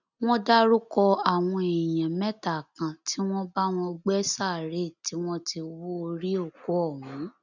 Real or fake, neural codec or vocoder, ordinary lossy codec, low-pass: real; none; none; 7.2 kHz